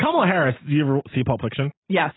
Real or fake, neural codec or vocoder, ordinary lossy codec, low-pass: real; none; AAC, 16 kbps; 7.2 kHz